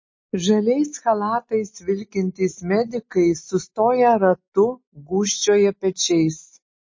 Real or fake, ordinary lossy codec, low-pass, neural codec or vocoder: real; MP3, 32 kbps; 7.2 kHz; none